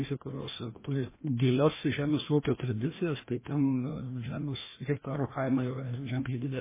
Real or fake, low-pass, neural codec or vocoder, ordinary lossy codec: fake; 3.6 kHz; codec, 16 kHz, 1 kbps, FreqCodec, larger model; MP3, 16 kbps